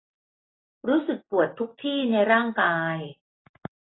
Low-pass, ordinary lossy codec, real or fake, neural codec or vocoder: 7.2 kHz; AAC, 16 kbps; real; none